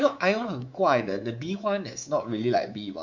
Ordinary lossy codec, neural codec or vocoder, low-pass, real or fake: Opus, 64 kbps; codec, 24 kHz, 3.1 kbps, DualCodec; 7.2 kHz; fake